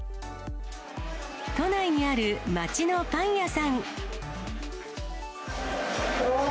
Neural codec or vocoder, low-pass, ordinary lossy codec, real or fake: none; none; none; real